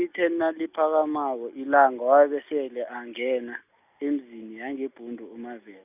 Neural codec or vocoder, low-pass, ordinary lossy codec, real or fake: none; 3.6 kHz; none; real